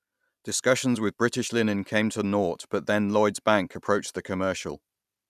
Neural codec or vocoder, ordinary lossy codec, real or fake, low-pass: none; none; real; 14.4 kHz